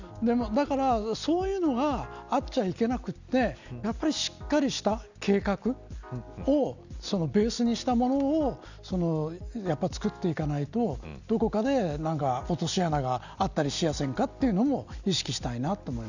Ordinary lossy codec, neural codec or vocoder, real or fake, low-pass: none; none; real; 7.2 kHz